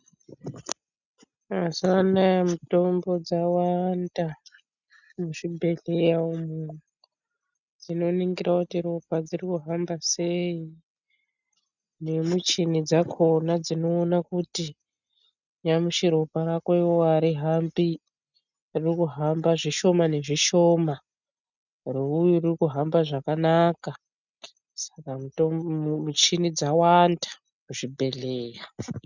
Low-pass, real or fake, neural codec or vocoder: 7.2 kHz; real; none